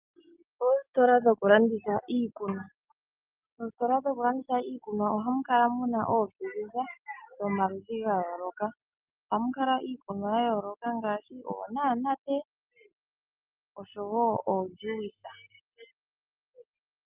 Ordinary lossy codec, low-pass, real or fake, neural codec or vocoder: Opus, 24 kbps; 3.6 kHz; real; none